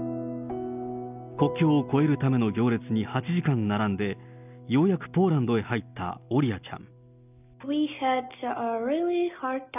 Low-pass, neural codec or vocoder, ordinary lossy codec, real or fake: 3.6 kHz; none; none; real